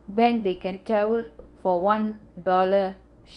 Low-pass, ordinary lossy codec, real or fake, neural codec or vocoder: 10.8 kHz; Opus, 64 kbps; fake; codec, 24 kHz, 0.9 kbps, WavTokenizer, medium speech release version 1